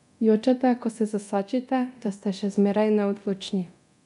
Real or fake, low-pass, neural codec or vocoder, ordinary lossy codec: fake; 10.8 kHz; codec, 24 kHz, 0.9 kbps, DualCodec; MP3, 96 kbps